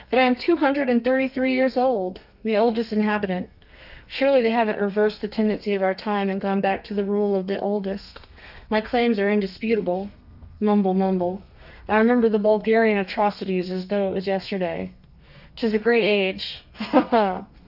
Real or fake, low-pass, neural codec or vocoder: fake; 5.4 kHz; codec, 32 kHz, 1.9 kbps, SNAC